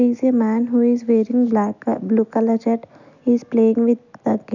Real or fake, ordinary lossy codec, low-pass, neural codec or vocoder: real; none; 7.2 kHz; none